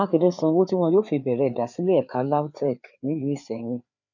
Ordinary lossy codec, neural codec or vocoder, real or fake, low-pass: none; codec, 16 kHz, 4 kbps, FreqCodec, larger model; fake; 7.2 kHz